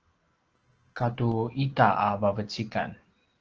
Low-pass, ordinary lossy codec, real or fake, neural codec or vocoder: 7.2 kHz; Opus, 16 kbps; real; none